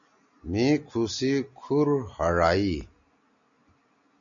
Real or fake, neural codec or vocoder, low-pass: real; none; 7.2 kHz